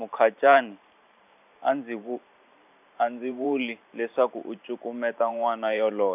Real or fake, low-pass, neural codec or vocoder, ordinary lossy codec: fake; 3.6 kHz; vocoder, 44.1 kHz, 128 mel bands every 512 samples, BigVGAN v2; none